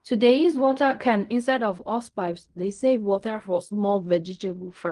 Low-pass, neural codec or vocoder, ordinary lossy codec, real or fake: 10.8 kHz; codec, 16 kHz in and 24 kHz out, 0.4 kbps, LongCat-Audio-Codec, fine tuned four codebook decoder; Opus, 32 kbps; fake